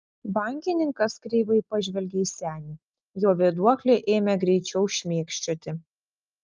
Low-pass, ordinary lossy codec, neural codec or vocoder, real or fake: 7.2 kHz; Opus, 24 kbps; none; real